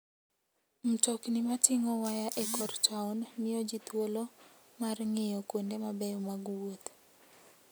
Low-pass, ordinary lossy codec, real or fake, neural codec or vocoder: none; none; real; none